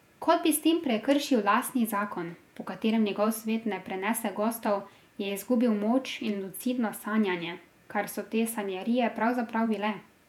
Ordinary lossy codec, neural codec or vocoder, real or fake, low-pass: none; vocoder, 48 kHz, 128 mel bands, Vocos; fake; 19.8 kHz